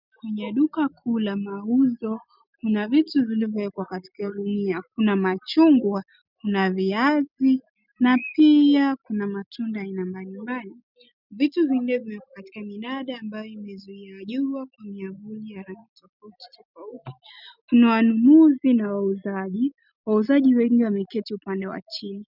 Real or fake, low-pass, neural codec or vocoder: real; 5.4 kHz; none